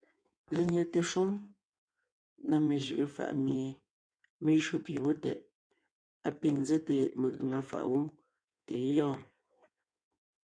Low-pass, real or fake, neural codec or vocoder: 9.9 kHz; fake; codec, 16 kHz in and 24 kHz out, 1.1 kbps, FireRedTTS-2 codec